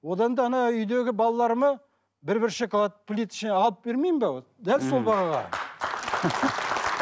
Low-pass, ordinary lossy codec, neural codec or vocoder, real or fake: none; none; none; real